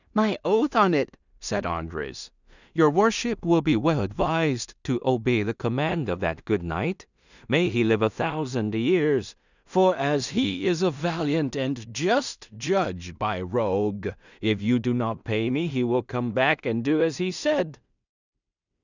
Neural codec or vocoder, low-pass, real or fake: codec, 16 kHz in and 24 kHz out, 0.4 kbps, LongCat-Audio-Codec, two codebook decoder; 7.2 kHz; fake